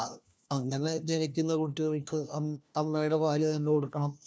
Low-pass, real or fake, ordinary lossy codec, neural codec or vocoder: none; fake; none; codec, 16 kHz, 1 kbps, FunCodec, trained on LibriTTS, 50 frames a second